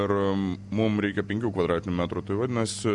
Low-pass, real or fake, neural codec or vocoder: 10.8 kHz; real; none